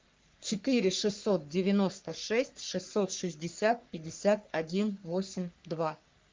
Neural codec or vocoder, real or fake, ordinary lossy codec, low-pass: codec, 44.1 kHz, 3.4 kbps, Pupu-Codec; fake; Opus, 32 kbps; 7.2 kHz